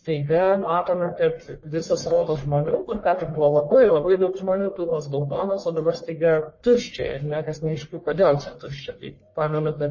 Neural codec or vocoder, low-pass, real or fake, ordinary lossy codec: codec, 44.1 kHz, 1.7 kbps, Pupu-Codec; 7.2 kHz; fake; MP3, 32 kbps